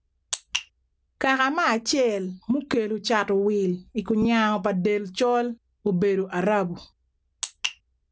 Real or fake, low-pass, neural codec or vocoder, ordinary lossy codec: real; none; none; none